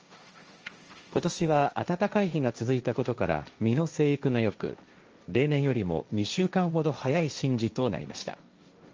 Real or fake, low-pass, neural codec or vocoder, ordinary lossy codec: fake; 7.2 kHz; codec, 16 kHz, 1.1 kbps, Voila-Tokenizer; Opus, 24 kbps